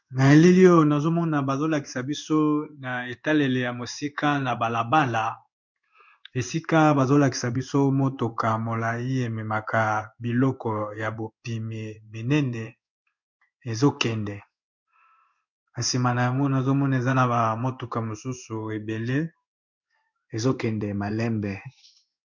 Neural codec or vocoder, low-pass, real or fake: codec, 16 kHz in and 24 kHz out, 1 kbps, XY-Tokenizer; 7.2 kHz; fake